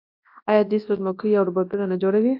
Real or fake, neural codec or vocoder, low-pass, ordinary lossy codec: fake; codec, 24 kHz, 0.9 kbps, WavTokenizer, large speech release; 5.4 kHz; AAC, 32 kbps